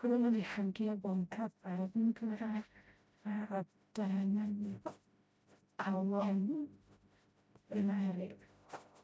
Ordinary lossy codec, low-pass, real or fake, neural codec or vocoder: none; none; fake; codec, 16 kHz, 0.5 kbps, FreqCodec, smaller model